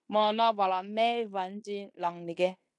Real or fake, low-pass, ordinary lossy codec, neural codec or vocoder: fake; 10.8 kHz; MP3, 64 kbps; codec, 16 kHz in and 24 kHz out, 0.9 kbps, LongCat-Audio-Codec, fine tuned four codebook decoder